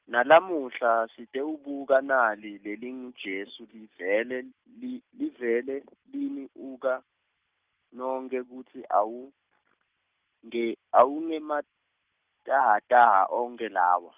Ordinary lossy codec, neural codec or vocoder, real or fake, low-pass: Opus, 32 kbps; none; real; 3.6 kHz